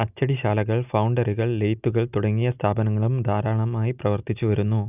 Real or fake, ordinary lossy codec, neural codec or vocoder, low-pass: real; none; none; 3.6 kHz